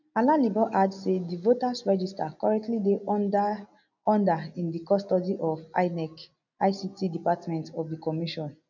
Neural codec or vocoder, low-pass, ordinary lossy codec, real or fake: none; 7.2 kHz; none; real